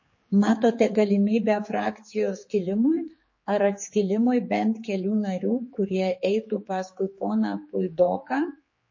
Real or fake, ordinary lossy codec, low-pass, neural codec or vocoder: fake; MP3, 32 kbps; 7.2 kHz; codec, 16 kHz, 4 kbps, X-Codec, HuBERT features, trained on general audio